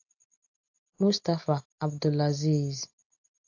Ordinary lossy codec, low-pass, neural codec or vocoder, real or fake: AAC, 48 kbps; 7.2 kHz; none; real